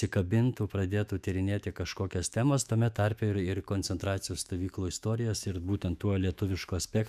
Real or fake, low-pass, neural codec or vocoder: fake; 14.4 kHz; autoencoder, 48 kHz, 128 numbers a frame, DAC-VAE, trained on Japanese speech